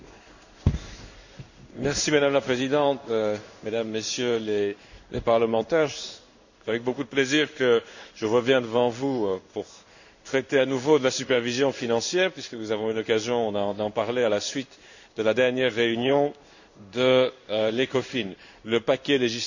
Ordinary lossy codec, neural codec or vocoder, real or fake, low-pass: none; codec, 16 kHz in and 24 kHz out, 1 kbps, XY-Tokenizer; fake; 7.2 kHz